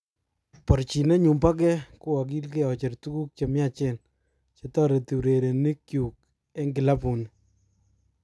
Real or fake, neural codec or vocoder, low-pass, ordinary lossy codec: real; none; none; none